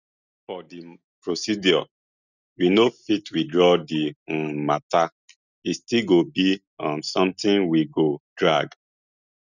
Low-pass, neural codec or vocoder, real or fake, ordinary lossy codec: 7.2 kHz; none; real; none